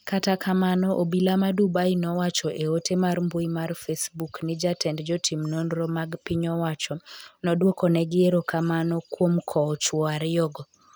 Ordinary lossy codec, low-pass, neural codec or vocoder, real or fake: none; none; none; real